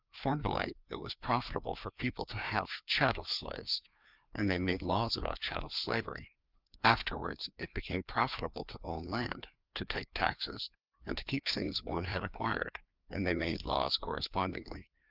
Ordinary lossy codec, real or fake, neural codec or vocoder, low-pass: Opus, 24 kbps; fake; codec, 16 kHz, 2 kbps, FreqCodec, larger model; 5.4 kHz